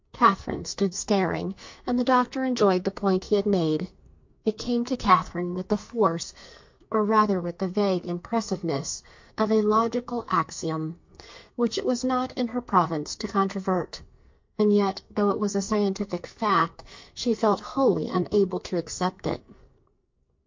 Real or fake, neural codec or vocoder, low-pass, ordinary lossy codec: fake; codec, 44.1 kHz, 2.6 kbps, SNAC; 7.2 kHz; MP3, 48 kbps